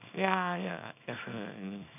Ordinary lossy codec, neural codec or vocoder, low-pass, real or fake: none; codec, 24 kHz, 0.9 kbps, WavTokenizer, small release; 3.6 kHz; fake